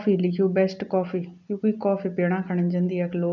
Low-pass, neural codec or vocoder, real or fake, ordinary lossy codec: 7.2 kHz; none; real; none